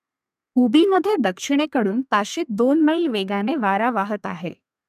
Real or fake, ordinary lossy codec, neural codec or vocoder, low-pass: fake; none; codec, 32 kHz, 1.9 kbps, SNAC; 14.4 kHz